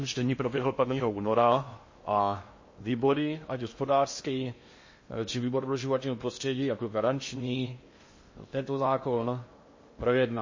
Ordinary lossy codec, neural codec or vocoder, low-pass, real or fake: MP3, 32 kbps; codec, 16 kHz in and 24 kHz out, 0.6 kbps, FocalCodec, streaming, 2048 codes; 7.2 kHz; fake